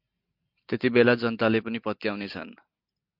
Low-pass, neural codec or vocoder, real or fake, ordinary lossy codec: 5.4 kHz; none; real; MP3, 48 kbps